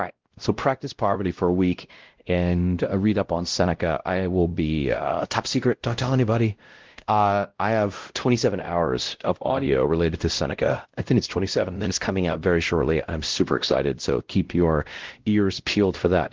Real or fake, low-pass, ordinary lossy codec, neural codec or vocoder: fake; 7.2 kHz; Opus, 16 kbps; codec, 16 kHz, 0.5 kbps, X-Codec, WavLM features, trained on Multilingual LibriSpeech